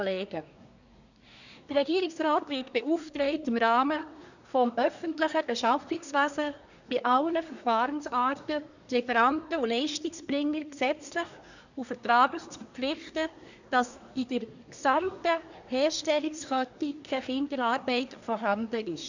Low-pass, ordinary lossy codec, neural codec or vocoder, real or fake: 7.2 kHz; none; codec, 24 kHz, 1 kbps, SNAC; fake